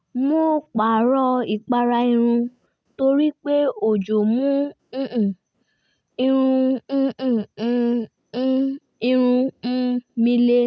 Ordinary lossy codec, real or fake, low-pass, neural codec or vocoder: none; real; none; none